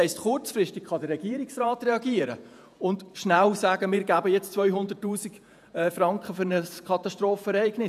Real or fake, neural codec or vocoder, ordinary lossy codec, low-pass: fake; vocoder, 48 kHz, 128 mel bands, Vocos; none; 14.4 kHz